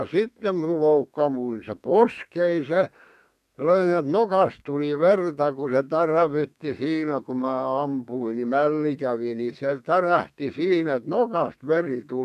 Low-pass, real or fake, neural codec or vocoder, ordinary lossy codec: 14.4 kHz; fake; codec, 32 kHz, 1.9 kbps, SNAC; none